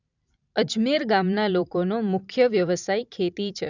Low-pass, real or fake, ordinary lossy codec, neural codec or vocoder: 7.2 kHz; fake; none; vocoder, 44.1 kHz, 128 mel bands every 512 samples, BigVGAN v2